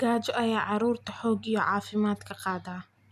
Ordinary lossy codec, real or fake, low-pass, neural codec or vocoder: none; real; 14.4 kHz; none